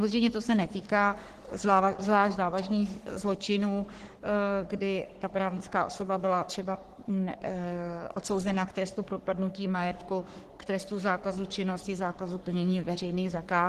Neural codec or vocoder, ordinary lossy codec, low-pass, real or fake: codec, 44.1 kHz, 3.4 kbps, Pupu-Codec; Opus, 16 kbps; 14.4 kHz; fake